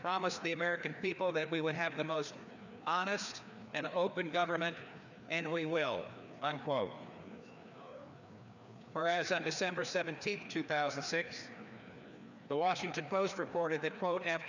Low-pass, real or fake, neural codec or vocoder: 7.2 kHz; fake; codec, 16 kHz, 2 kbps, FreqCodec, larger model